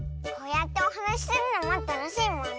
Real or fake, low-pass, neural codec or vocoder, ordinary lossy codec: real; none; none; none